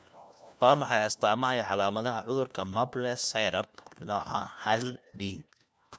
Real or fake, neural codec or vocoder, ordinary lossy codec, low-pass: fake; codec, 16 kHz, 1 kbps, FunCodec, trained on LibriTTS, 50 frames a second; none; none